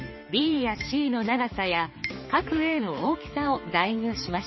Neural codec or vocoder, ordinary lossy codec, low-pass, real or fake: codec, 16 kHz, 4 kbps, X-Codec, HuBERT features, trained on balanced general audio; MP3, 24 kbps; 7.2 kHz; fake